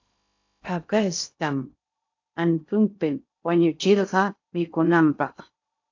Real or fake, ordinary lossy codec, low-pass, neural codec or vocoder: fake; AAC, 48 kbps; 7.2 kHz; codec, 16 kHz in and 24 kHz out, 0.6 kbps, FocalCodec, streaming, 2048 codes